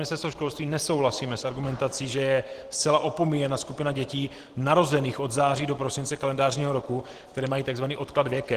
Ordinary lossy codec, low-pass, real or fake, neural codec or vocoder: Opus, 16 kbps; 14.4 kHz; real; none